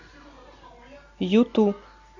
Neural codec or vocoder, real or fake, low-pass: none; real; 7.2 kHz